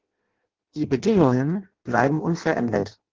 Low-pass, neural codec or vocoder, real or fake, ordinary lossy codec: 7.2 kHz; codec, 16 kHz in and 24 kHz out, 0.6 kbps, FireRedTTS-2 codec; fake; Opus, 16 kbps